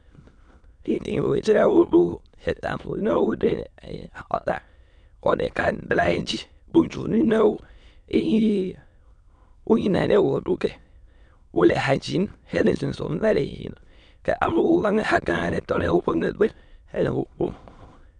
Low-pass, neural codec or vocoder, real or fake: 9.9 kHz; autoencoder, 22.05 kHz, a latent of 192 numbers a frame, VITS, trained on many speakers; fake